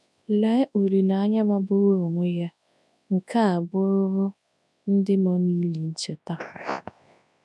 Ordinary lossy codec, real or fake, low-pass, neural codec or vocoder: none; fake; none; codec, 24 kHz, 0.9 kbps, WavTokenizer, large speech release